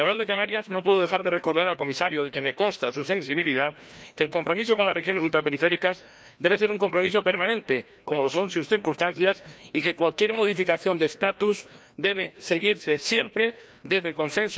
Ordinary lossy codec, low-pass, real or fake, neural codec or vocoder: none; none; fake; codec, 16 kHz, 1 kbps, FreqCodec, larger model